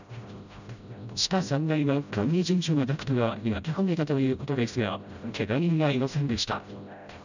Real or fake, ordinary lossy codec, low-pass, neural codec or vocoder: fake; none; 7.2 kHz; codec, 16 kHz, 0.5 kbps, FreqCodec, smaller model